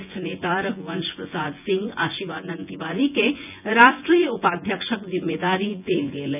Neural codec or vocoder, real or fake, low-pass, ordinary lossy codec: vocoder, 24 kHz, 100 mel bands, Vocos; fake; 3.6 kHz; none